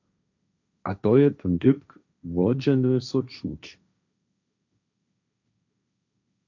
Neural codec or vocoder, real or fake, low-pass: codec, 16 kHz, 1.1 kbps, Voila-Tokenizer; fake; 7.2 kHz